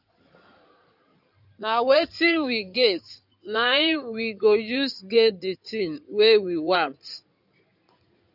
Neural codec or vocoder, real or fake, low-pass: codec, 16 kHz in and 24 kHz out, 2.2 kbps, FireRedTTS-2 codec; fake; 5.4 kHz